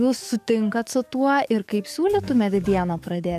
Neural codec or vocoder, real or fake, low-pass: codec, 44.1 kHz, 7.8 kbps, DAC; fake; 14.4 kHz